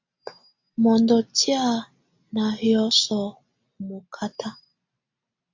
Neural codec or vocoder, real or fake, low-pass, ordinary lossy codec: none; real; 7.2 kHz; MP3, 64 kbps